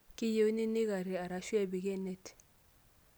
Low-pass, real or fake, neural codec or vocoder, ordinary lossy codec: none; real; none; none